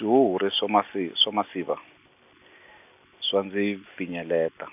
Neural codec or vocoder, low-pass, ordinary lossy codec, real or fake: none; 3.6 kHz; none; real